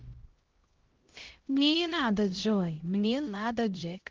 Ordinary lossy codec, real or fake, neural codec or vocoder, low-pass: Opus, 16 kbps; fake; codec, 16 kHz, 0.5 kbps, X-Codec, HuBERT features, trained on LibriSpeech; 7.2 kHz